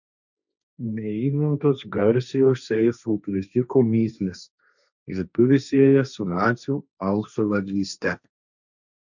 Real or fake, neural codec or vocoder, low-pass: fake; codec, 16 kHz, 1.1 kbps, Voila-Tokenizer; 7.2 kHz